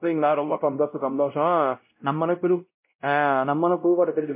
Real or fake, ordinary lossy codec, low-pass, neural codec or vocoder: fake; MP3, 24 kbps; 3.6 kHz; codec, 16 kHz, 0.5 kbps, X-Codec, WavLM features, trained on Multilingual LibriSpeech